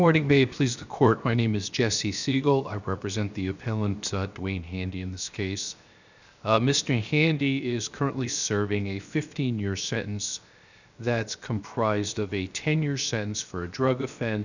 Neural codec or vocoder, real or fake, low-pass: codec, 16 kHz, 0.7 kbps, FocalCodec; fake; 7.2 kHz